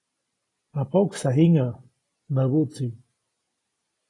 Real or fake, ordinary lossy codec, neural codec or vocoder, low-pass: real; AAC, 32 kbps; none; 10.8 kHz